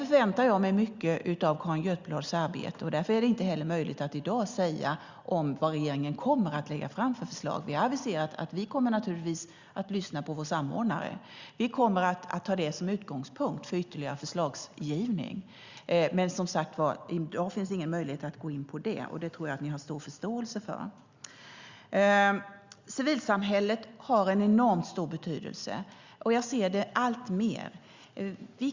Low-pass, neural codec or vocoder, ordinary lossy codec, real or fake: 7.2 kHz; none; Opus, 64 kbps; real